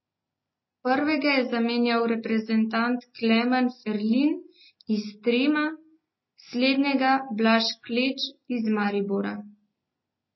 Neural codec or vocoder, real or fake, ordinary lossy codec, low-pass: none; real; MP3, 24 kbps; 7.2 kHz